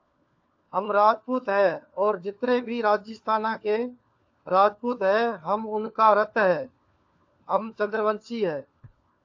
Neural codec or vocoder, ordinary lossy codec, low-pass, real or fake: codec, 16 kHz, 4 kbps, FunCodec, trained on LibriTTS, 50 frames a second; AAC, 48 kbps; 7.2 kHz; fake